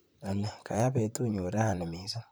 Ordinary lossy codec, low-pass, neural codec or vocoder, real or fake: none; none; vocoder, 44.1 kHz, 128 mel bands, Pupu-Vocoder; fake